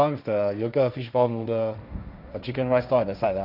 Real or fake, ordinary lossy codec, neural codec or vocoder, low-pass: fake; none; codec, 16 kHz, 1.1 kbps, Voila-Tokenizer; 5.4 kHz